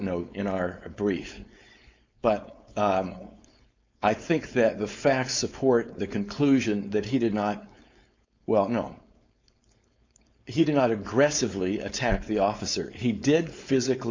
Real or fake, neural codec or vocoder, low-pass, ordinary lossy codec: fake; codec, 16 kHz, 4.8 kbps, FACodec; 7.2 kHz; MP3, 64 kbps